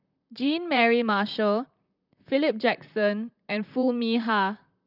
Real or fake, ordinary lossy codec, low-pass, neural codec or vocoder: fake; none; 5.4 kHz; vocoder, 44.1 kHz, 128 mel bands, Pupu-Vocoder